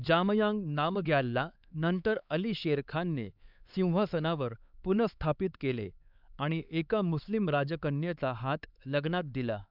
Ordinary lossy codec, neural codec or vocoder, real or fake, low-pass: none; codec, 16 kHz, 4 kbps, X-Codec, HuBERT features, trained on LibriSpeech; fake; 5.4 kHz